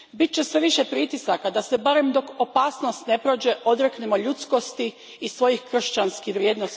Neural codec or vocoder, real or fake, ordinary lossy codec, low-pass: none; real; none; none